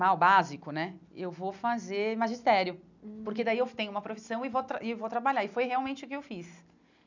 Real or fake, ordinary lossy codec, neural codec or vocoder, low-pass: real; none; none; 7.2 kHz